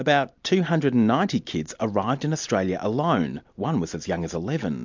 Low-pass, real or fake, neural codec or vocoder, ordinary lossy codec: 7.2 kHz; real; none; MP3, 64 kbps